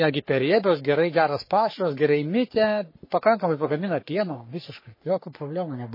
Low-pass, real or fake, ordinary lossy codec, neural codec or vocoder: 5.4 kHz; fake; MP3, 24 kbps; codec, 44.1 kHz, 3.4 kbps, Pupu-Codec